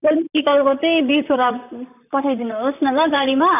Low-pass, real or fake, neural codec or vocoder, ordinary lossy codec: 3.6 kHz; fake; vocoder, 44.1 kHz, 128 mel bands, Pupu-Vocoder; none